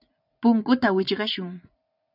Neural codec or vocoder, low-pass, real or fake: vocoder, 44.1 kHz, 80 mel bands, Vocos; 5.4 kHz; fake